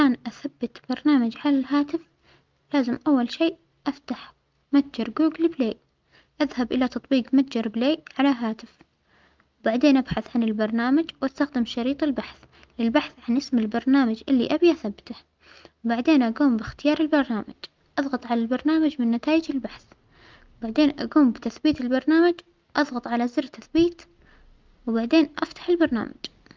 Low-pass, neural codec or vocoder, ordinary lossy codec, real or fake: 7.2 kHz; none; Opus, 24 kbps; real